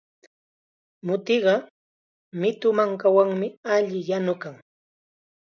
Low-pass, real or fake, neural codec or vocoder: 7.2 kHz; real; none